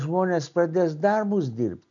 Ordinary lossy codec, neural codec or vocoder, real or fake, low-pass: MP3, 96 kbps; none; real; 7.2 kHz